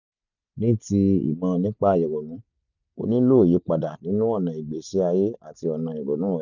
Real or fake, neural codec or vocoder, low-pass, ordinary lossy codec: real; none; 7.2 kHz; none